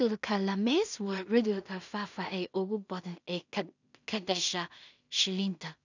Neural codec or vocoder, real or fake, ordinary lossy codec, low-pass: codec, 16 kHz in and 24 kHz out, 0.4 kbps, LongCat-Audio-Codec, two codebook decoder; fake; none; 7.2 kHz